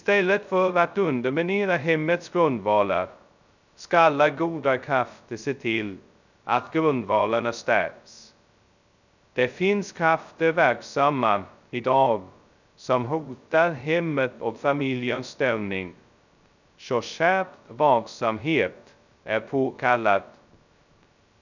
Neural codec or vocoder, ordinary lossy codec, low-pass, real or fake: codec, 16 kHz, 0.2 kbps, FocalCodec; none; 7.2 kHz; fake